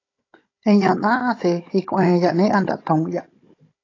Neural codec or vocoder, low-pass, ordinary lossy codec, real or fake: codec, 16 kHz, 16 kbps, FunCodec, trained on Chinese and English, 50 frames a second; 7.2 kHz; AAC, 32 kbps; fake